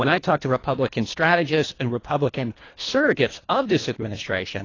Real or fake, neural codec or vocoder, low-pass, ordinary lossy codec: fake; codec, 24 kHz, 1.5 kbps, HILCodec; 7.2 kHz; AAC, 32 kbps